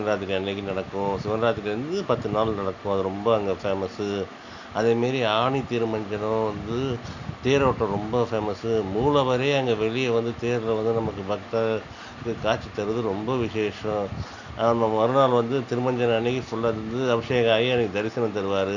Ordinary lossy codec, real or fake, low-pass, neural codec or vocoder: none; real; 7.2 kHz; none